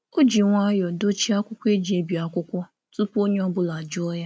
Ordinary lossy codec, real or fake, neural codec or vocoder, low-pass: none; real; none; none